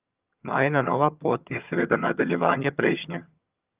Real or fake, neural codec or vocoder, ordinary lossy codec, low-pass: fake; vocoder, 22.05 kHz, 80 mel bands, HiFi-GAN; Opus, 24 kbps; 3.6 kHz